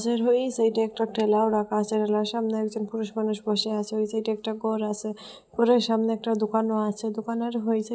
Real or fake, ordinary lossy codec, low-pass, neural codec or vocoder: real; none; none; none